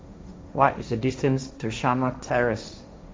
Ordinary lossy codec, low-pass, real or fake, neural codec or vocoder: none; none; fake; codec, 16 kHz, 1.1 kbps, Voila-Tokenizer